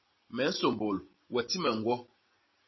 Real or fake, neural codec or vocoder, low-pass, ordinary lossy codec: real; none; 7.2 kHz; MP3, 24 kbps